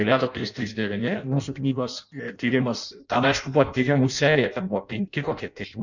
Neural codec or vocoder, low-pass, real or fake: codec, 16 kHz in and 24 kHz out, 0.6 kbps, FireRedTTS-2 codec; 7.2 kHz; fake